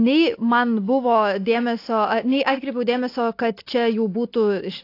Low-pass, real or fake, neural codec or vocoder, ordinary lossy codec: 5.4 kHz; real; none; AAC, 32 kbps